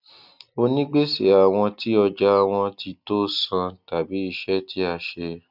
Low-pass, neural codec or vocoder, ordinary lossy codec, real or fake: 5.4 kHz; none; none; real